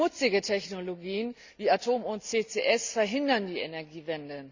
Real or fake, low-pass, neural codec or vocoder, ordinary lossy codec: real; 7.2 kHz; none; Opus, 64 kbps